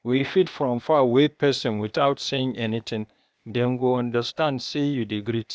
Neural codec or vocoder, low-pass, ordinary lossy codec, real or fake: codec, 16 kHz, 0.8 kbps, ZipCodec; none; none; fake